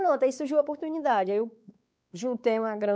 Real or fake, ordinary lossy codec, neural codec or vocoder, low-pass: fake; none; codec, 16 kHz, 4 kbps, X-Codec, WavLM features, trained on Multilingual LibriSpeech; none